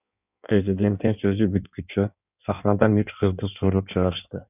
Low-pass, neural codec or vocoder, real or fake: 3.6 kHz; codec, 16 kHz in and 24 kHz out, 1.1 kbps, FireRedTTS-2 codec; fake